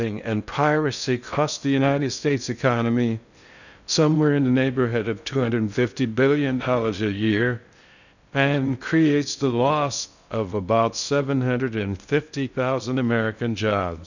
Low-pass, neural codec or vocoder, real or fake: 7.2 kHz; codec, 16 kHz in and 24 kHz out, 0.6 kbps, FocalCodec, streaming, 4096 codes; fake